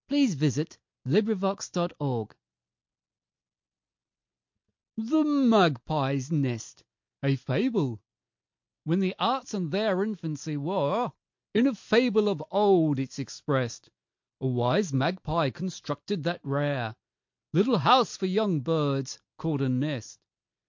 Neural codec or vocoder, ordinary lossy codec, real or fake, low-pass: none; MP3, 48 kbps; real; 7.2 kHz